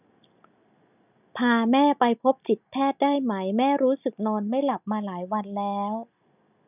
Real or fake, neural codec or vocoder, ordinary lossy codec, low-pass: real; none; none; 3.6 kHz